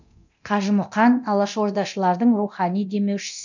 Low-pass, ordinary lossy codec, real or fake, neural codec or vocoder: 7.2 kHz; none; fake; codec, 24 kHz, 0.9 kbps, DualCodec